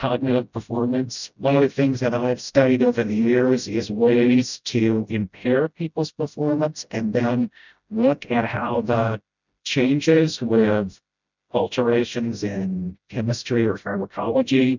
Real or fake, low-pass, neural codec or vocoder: fake; 7.2 kHz; codec, 16 kHz, 0.5 kbps, FreqCodec, smaller model